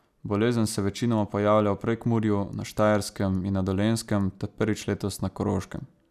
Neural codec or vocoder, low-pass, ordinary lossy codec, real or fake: none; 14.4 kHz; none; real